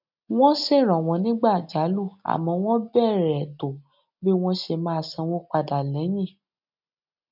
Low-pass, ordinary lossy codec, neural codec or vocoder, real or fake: 5.4 kHz; none; none; real